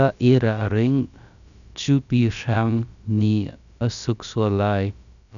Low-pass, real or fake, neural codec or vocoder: 7.2 kHz; fake; codec, 16 kHz, about 1 kbps, DyCAST, with the encoder's durations